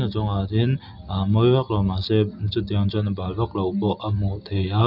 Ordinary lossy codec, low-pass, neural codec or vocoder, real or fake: none; 5.4 kHz; none; real